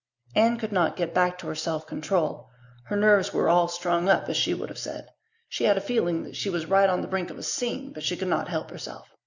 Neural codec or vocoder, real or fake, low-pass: none; real; 7.2 kHz